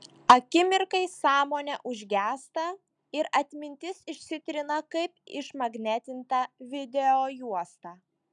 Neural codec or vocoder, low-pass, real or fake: none; 10.8 kHz; real